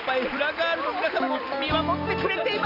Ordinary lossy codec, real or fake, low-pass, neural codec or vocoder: none; real; 5.4 kHz; none